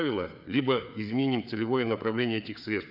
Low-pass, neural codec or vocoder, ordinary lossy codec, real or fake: 5.4 kHz; codec, 16 kHz, 4 kbps, FreqCodec, larger model; none; fake